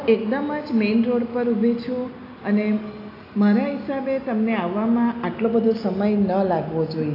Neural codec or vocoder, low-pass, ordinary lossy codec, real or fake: none; 5.4 kHz; AAC, 32 kbps; real